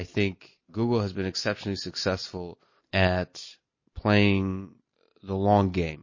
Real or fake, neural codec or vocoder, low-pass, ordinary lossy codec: real; none; 7.2 kHz; MP3, 32 kbps